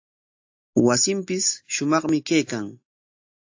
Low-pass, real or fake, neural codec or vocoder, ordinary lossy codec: 7.2 kHz; real; none; AAC, 48 kbps